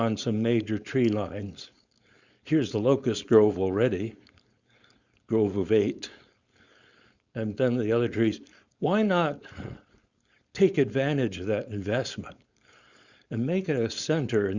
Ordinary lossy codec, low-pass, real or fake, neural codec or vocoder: Opus, 64 kbps; 7.2 kHz; fake; codec, 16 kHz, 4.8 kbps, FACodec